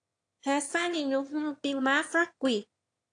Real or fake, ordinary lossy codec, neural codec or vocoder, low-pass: fake; AAC, 48 kbps; autoencoder, 22.05 kHz, a latent of 192 numbers a frame, VITS, trained on one speaker; 9.9 kHz